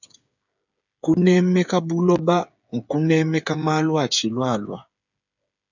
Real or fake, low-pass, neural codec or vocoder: fake; 7.2 kHz; codec, 16 kHz, 8 kbps, FreqCodec, smaller model